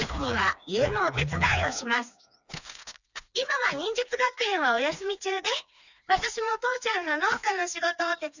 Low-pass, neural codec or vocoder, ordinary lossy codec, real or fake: 7.2 kHz; codec, 16 kHz, 2 kbps, FreqCodec, smaller model; none; fake